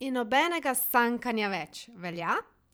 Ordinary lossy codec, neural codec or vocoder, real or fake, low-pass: none; vocoder, 44.1 kHz, 128 mel bands every 512 samples, BigVGAN v2; fake; none